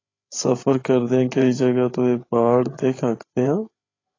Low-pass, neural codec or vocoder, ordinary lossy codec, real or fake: 7.2 kHz; codec, 16 kHz, 8 kbps, FreqCodec, larger model; AAC, 32 kbps; fake